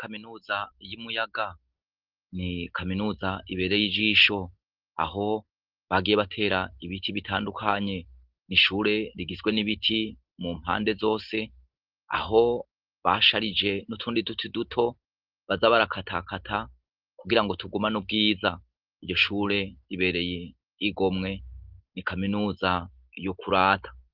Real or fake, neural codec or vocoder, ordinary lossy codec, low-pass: real; none; Opus, 16 kbps; 5.4 kHz